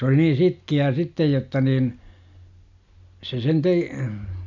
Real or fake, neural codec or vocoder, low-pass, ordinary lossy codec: real; none; 7.2 kHz; none